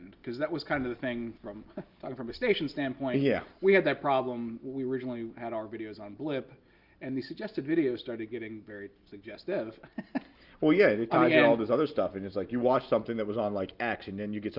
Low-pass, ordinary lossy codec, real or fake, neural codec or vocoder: 5.4 kHz; Opus, 32 kbps; real; none